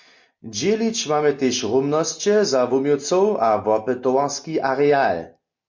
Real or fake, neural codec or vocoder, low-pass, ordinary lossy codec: real; none; 7.2 kHz; MP3, 48 kbps